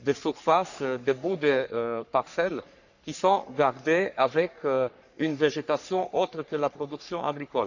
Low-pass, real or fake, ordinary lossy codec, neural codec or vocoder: 7.2 kHz; fake; none; codec, 44.1 kHz, 3.4 kbps, Pupu-Codec